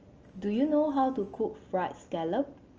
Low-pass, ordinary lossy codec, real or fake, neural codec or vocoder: 7.2 kHz; Opus, 24 kbps; real; none